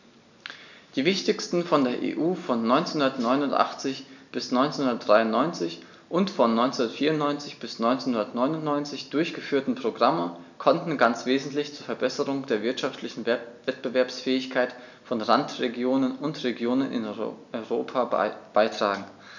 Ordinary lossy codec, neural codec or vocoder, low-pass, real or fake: none; none; 7.2 kHz; real